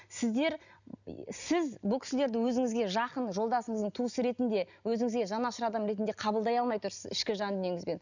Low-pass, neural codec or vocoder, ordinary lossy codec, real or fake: 7.2 kHz; none; none; real